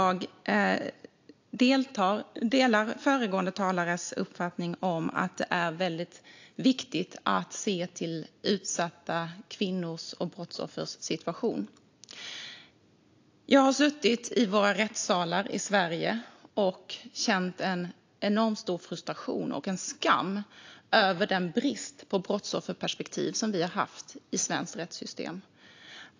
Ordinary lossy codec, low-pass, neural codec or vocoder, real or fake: AAC, 48 kbps; 7.2 kHz; none; real